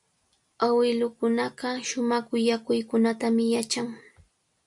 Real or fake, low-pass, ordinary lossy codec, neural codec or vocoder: real; 10.8 kHz; MP3, 96 kbps; none